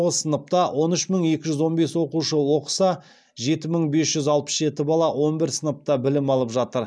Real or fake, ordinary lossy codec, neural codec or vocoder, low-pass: real; none; none; none